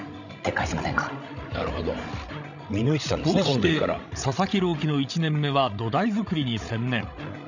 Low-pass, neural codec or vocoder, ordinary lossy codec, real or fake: 7.2 kHz; codec, 16 kHz, 16 kbps, FreqCodec, larger model; none; fake